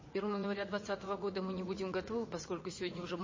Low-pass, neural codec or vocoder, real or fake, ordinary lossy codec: 7.2 kHz; vocoder, 44.1 kHz, 80 mel bands, Vocos; fake; MP3, 32 kbps